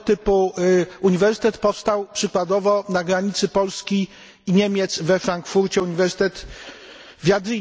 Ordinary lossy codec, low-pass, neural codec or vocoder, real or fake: none; none; none; real